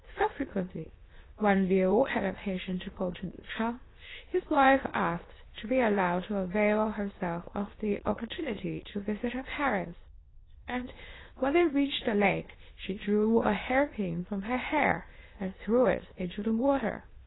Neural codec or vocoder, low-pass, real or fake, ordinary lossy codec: autoencoder, 22.05 kHz, a latent of 192 numbers a frame, VITS, trained on many speakers; 7.2 kHz; fake; AAC, 16 kbps